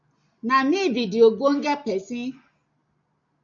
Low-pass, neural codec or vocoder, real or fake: 7.2 kHz; none; real